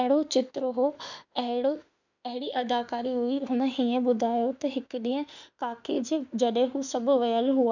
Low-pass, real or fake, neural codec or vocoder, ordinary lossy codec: 7.2 kHz; fake; autoencoder, 48 kHz, 32 numbers a frame, DAC-VAE, trained on Japanese speech; none